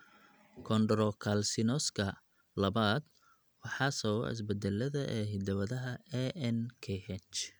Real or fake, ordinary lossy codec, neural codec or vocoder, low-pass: real; none; none; none